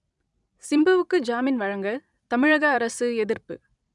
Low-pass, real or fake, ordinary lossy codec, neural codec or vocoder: 10.8 kHz; fake; none; vocoder, 44.1 kHz, 128 mel bands every 256 samples, BigVGAN v2